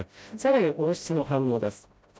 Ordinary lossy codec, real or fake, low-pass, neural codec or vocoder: none; fake; none; codec, 16 kHz, 0.5 kbps, FreqCodec, smaller model